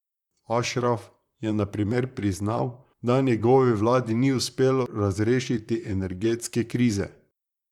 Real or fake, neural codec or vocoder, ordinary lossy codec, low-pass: fake; vocoder, 44.1 kHz, 128 mel bands, Pupu-Vocoder; none; 19.8 kHz